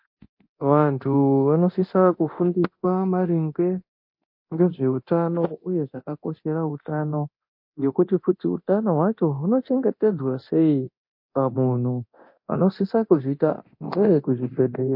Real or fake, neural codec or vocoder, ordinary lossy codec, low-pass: fake; codec, 24 kHz, 0.9 kbps, DualCodec; MP3, 32 kbps; 5.4 kHz